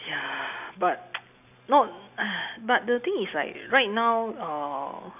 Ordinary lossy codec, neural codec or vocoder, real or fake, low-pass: none; none; real; 3.6 kHz